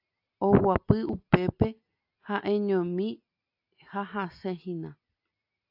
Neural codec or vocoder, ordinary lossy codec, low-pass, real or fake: none; AAC, 48 kbps; 5.4 kHz; real